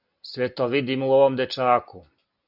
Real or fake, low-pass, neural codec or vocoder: real; 5.4 kHz; none